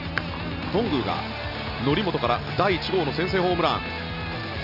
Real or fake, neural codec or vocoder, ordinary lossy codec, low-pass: real; none; none; 5.4 kHz